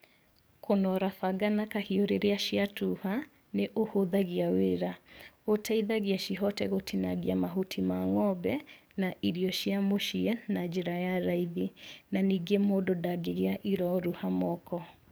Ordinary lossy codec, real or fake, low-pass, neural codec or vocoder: none; fake; none; codec, 44.1 kHz, 7.8 kbps, DAC